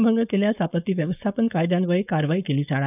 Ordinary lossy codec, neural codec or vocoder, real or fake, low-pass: none; codec, 16 kHz, 4.8 kbps, FACodec; fake; 3.6 kHz